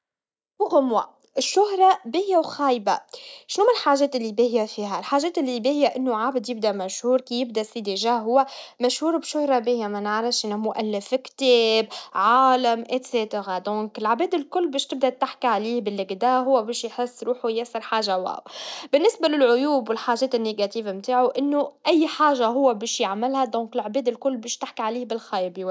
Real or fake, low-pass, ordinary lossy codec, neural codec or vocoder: real; none; none; none